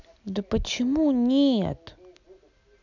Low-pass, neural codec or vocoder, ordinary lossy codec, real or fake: 7.2 kHz; none; none; real